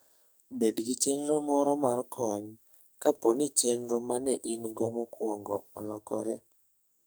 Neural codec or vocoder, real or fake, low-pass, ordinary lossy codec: codec, 44.1 kHz, 2.6 kbps, SNAC; fake; none; none